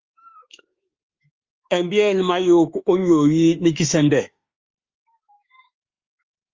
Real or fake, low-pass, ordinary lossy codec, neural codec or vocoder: fake; 7.2 kHz; Opus, 32 kbps; autoencoder, 48 kHz, 32 numbers a frame, DAC-VAE, trained on Japanese speech